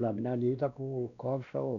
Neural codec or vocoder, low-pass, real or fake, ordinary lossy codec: codec, 16 kHz, 1 kbps, X-Codec, WavLM features, trained on Multilingual LibriSpeech; 7.2 kHz; fake; none